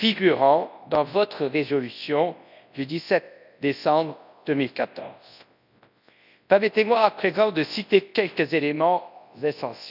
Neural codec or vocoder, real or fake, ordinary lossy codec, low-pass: codec, 24 kHz, 0.9 kbps, WavTokenizer, large speech release; fake; none; 5.4 kHz